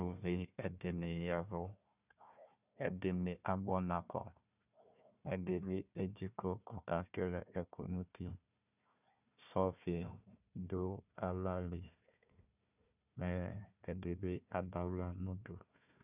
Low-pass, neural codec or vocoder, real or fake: 3.6 kHz; codec, 16 kHz, 1 kbps, FunCodec, trained on Chinese and English, 50 frames a second; fake